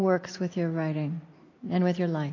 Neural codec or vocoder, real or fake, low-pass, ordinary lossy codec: none; real; 7.2 kHz; AAC, 32 kbps